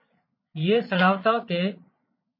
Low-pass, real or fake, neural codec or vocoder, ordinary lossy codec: 5.4 kHz; fake; vocoder, 22.05 kHz, 80 mel bands, Vocos; MP3, 24 kbps